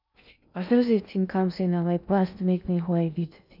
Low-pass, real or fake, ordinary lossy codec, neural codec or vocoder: 5.4 kHz; fake; none; codec, 16 kHz in and 24 kHz out, 0.6 kbps, FocalCodec, streaming, 2048 codes